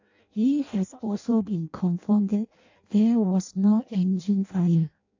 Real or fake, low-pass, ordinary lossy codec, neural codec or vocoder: fake; 7.2 kHz; AAC, 48 kbps; codec, 16 kHz in and 24 kHz out, 0.6 kbps, FireRedTTS-2 codec